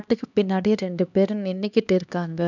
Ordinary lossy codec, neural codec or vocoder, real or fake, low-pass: none; codec, 16 kHz, 2 kbps, X-Codec, HuBERT features, trained on LibriSpeech; fake; 7.2 kHz